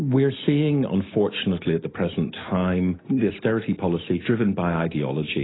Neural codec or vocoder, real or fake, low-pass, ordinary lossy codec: none; real; 7.2 kHz; AAC, 16 kbps